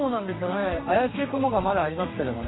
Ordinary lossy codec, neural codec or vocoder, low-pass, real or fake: AAC, 16 kbps; codec, 32 kHz, 1.9 kbps, SNAC; 7.2 kHz; fake